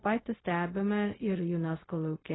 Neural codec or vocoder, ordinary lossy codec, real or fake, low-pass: codec, 16 kHz, 0.4 kbps, LongCat-Audio-Codec; AAC, 16 kbps; fake; 7.2 kHz